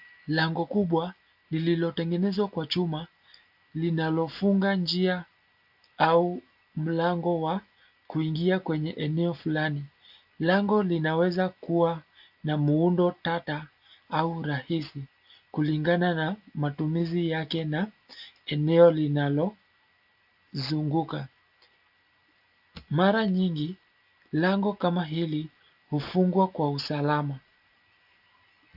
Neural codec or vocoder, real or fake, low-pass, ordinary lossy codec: none; real; 5.4 kHz; AAC, 48 kbps